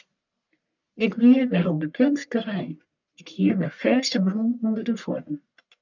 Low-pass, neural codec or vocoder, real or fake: 7.2 kHz; codec, 44.1 kHz, 1.7 kbps, Pupu-Codec; fake